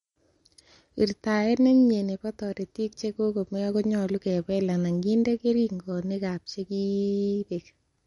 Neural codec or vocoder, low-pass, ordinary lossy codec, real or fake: none; 14.4 kHz; MP3, 48 kbps; real